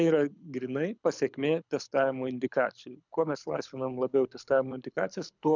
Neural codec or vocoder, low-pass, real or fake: codec, 24 kHz, 6 kbps, HILCodec; 7.2 kHz; fake